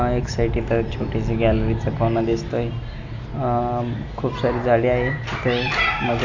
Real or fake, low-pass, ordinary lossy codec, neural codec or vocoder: real; 7.2 kHz; AAC, 48 kbps; none